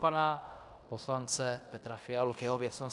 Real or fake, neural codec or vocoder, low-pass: fake; codec, 16 kHz in and 24 kHz out, 0.9 kbps, LongCat-Audio-Codec, fine tuned four codebook decoder; 10.8 kHz